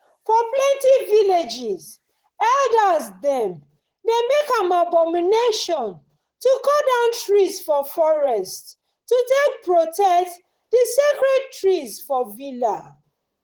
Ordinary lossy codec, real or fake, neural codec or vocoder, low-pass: Opus, 16 kbps; fake; vocoder, 44.1 kHz, 128 mel bands, Pupu-Vocoder; 19.8 kHz